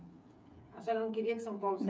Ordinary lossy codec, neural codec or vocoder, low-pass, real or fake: none; codec, 16 kHz, 8 kbps, FreqCodec, smaller model; none; fake